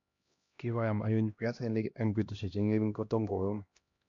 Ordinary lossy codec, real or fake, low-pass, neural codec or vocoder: AAC, 64 kbps; fake; 7.2 kHz; codec, 16 kHz, 1 kbps, X-Codec, HuBERT features, trained on LibriSpeech